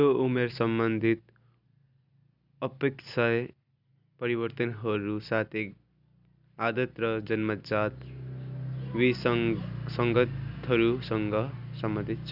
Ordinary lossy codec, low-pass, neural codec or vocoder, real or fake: none; 5.4 kHz; none; real